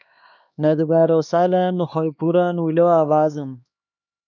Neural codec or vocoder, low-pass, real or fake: codec, 16 kHz, 2 kbps, X-Codec, HuBERT features, trained on LibriSpeech; 7.2 kHz; fake